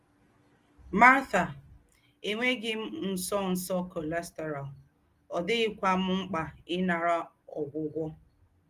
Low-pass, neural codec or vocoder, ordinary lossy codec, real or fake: 14.4 kHz; none; Opus, 24 kbps; real